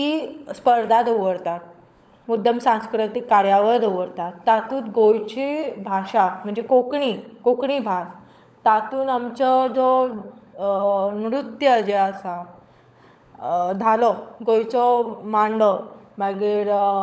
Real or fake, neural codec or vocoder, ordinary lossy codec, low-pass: fake; codec, 16 kHz, 16 kbps, FunCodec, trained on LibriTTS, 50 frames a second; none; none